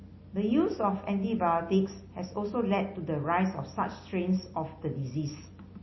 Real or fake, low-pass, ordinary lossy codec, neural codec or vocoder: real; 7.2 kHz; MP3, 24 kbps; none